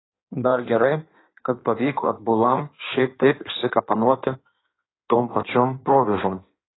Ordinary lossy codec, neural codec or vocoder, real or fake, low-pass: AAC, 16 kbps; codec, 16 kHz in and 24 kHz out, 1.1 kbps, FireRedTTS-2 codec; fake; 7.2 kHz